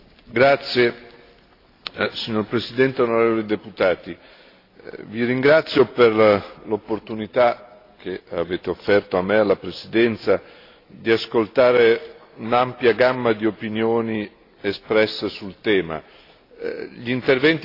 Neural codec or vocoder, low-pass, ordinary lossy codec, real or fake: none; 5.4 kHz; AAC, 32 kbps; real